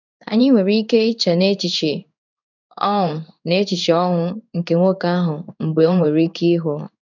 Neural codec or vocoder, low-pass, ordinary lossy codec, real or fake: codec, 16 kHz in and 24 kHz out, 1 kbps, XY-Tokenizer; 7.2 kHz; none; fake